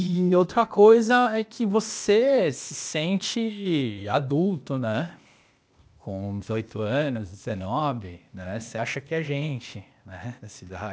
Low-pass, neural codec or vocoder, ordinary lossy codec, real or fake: none; codec, 16 kHz, 0.8 kbps, ZipCodec; none; fake